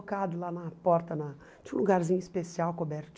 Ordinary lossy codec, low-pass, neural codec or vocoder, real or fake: none; none; none; real